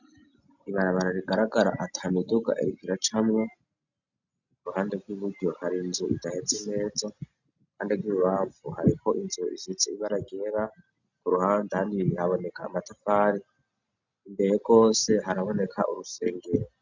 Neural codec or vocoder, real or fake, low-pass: none; real; 7.2 kHz